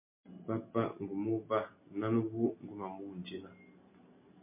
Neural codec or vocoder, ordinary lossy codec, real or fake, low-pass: none; MP3, 24 kbps; real; 3.6 kHz